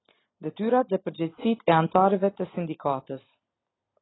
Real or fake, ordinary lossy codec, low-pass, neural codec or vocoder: real; AAC, 16 kbps; 7.2 kHz; none